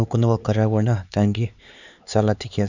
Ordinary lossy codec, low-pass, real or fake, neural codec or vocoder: none; 7.2 kHz; fake; codec, 16 kHz, 4 kbps, X-Codec, HuBERT features, trained on LibriSpeech